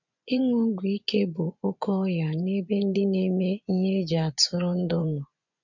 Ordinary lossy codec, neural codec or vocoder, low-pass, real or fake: none; vocoder, 24 kHz, 100 mel bands, Vocos; 7.2 kHz; fake